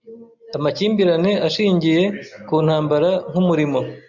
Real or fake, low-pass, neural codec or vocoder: real; 7.2 kHz; none